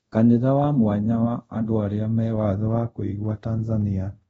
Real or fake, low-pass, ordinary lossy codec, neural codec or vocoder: fake; 10.8 kHz; AAC, 24 kbps; codec, 24 kHz, 0.5 kbps, DualCodec